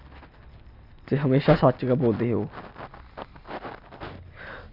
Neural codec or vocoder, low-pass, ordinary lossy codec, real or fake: none; 5.4 kHz; none; real